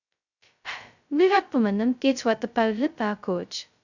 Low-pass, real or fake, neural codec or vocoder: 7.2 kHz; fake; codec, 16 kHz, 0.2 kbps, FocalCodec